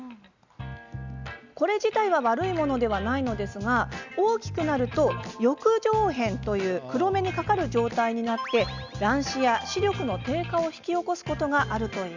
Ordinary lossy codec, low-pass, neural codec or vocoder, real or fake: Opus, 64 kbps; 7.2 kHz; none; real